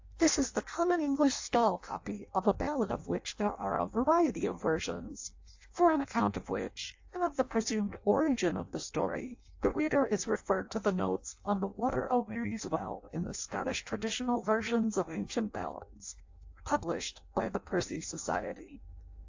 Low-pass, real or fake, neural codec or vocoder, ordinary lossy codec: 7.2 kHz; fake; codec, 16 kHz in and 24 kHz out, 0.6 kbps, FireRedTTS-2 codec; AAC, 48 kbps